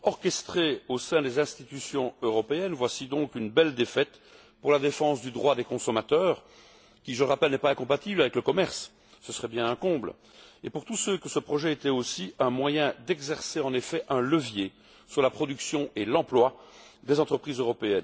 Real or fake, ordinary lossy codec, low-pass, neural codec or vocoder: real; none; none; none